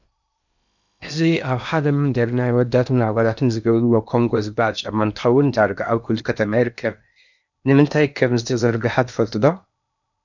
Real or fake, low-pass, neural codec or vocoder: fake; 7.2 kHz; codec, 16 kHz in and 24 kHz out, 0.8 kbps, FocalCodec, streaming, 65536 codes